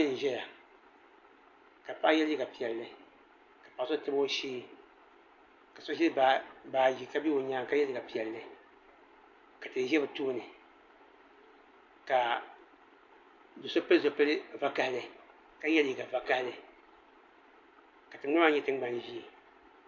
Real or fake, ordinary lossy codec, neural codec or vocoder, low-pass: real; MP3, 48 kbps; none; 7.2 kHz